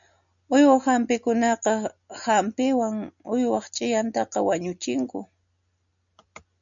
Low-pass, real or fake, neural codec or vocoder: 7.2 kHz; real; none